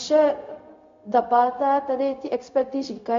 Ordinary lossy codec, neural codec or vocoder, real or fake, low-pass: MP3, 48 kbps; codec, 16 kHz, 0.4 kbps, LongCat-Audio-Codec; fake; 7.2 kHz